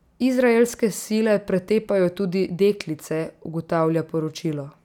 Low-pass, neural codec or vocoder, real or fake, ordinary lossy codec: 19.8 kHz; none; real; none